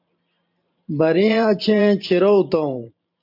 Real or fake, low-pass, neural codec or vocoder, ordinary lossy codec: fake; 5.4 kHz; vocoder, 44.1 kHz, 128 mel bands every 512 samples, BigVGAN v2; MP3, 48 kbps